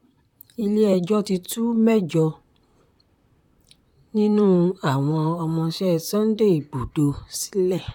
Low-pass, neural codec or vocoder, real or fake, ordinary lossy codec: 19.8 kHz; vocoder, 44.1 kHz, 128 mel bands, Pupu-Vocoder; fake; none